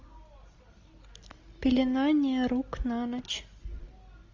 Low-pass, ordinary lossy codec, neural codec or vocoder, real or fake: 7.2 kHz; AAC, 48 kbps; none; real